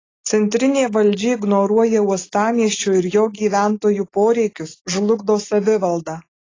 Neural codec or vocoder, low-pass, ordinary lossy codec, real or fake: none; 7.2 kHz; AAC, 32 kbps; real